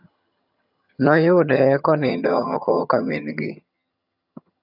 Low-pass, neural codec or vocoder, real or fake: 5.4 kHz; vocoder, 22.05 kHz, 80 mel bands, HiFi-GAN; fake